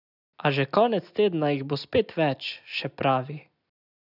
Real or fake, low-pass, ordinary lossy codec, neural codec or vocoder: real; 5.4 kHz; none; none